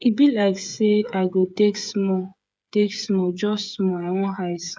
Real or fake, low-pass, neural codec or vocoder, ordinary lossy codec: fake; none; codec, 16 kHz, 8 kbps, FreqCodec, smaller model; none